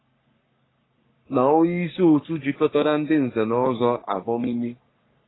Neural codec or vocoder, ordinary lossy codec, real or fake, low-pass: codec, 44.1 kHz, 3.4 kbps, Pupu-Codec; AAC, 16 kbps; fake; 7.2 kHz